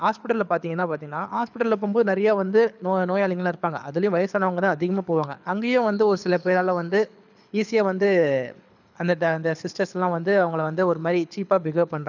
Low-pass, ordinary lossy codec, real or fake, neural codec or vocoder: 7.2 kHz; none; fake; codec, 24 kHz, 6 kbps, HILCodec